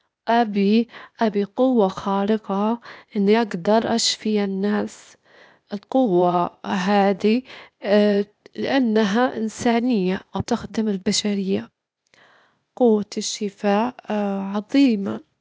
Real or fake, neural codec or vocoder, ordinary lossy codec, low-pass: fake; codec, 16 kHz, 0.8 kbps, ZipCodec; none; none